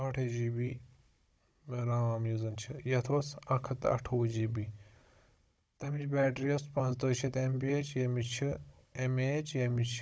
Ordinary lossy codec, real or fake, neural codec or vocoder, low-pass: none; fake; codec, 16 kHz, 16 kbps, FunCodec, trained on Chinese and English, 50 frames a second; none